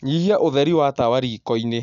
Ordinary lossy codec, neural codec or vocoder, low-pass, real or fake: none; none; 7.2 kHz; real